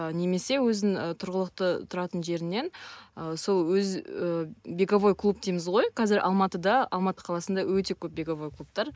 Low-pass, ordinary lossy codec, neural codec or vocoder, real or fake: none; none; none; real